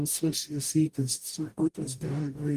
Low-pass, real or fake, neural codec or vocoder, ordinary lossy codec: 14.4 kHz; fake; codec, 44.1 kHz, 0.9 kbps, DAC; Opus, 32 kbps